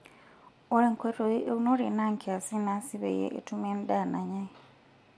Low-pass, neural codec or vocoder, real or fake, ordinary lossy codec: none; none; real; none